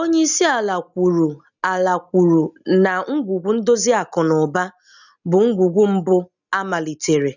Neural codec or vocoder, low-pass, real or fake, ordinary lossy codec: none; 7.2 kHz; real; none